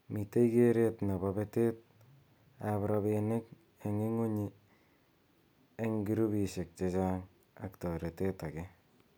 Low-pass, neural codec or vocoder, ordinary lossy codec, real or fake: none; none; none; real